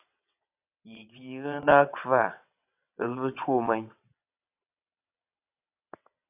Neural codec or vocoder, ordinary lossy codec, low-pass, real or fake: vocoder, 22.05 kHz, 80 mel bands, WaveNeXt; AAC, 32 kbps; 3.6 kHz; fake